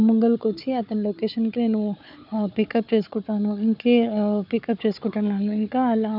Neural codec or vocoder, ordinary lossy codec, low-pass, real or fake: codec, 16 kHz, 4 kbps, FunCodec, trained on Chinese and English, 50 frames a second; none; 5.4 kHz; fake